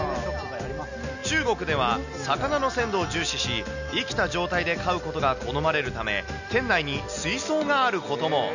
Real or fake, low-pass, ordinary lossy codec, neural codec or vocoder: real; 7.2 kHz; none; none